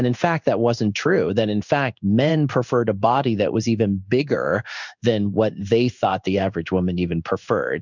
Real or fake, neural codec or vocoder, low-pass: fake; codec, 16 kHz in and 24 kHz out, 1 kbps, XY-Tokenizer; 7.2 kHz